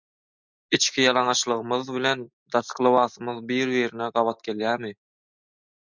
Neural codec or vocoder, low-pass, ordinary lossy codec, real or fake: none; 7.2 kHz; MP3, 64 kbps; real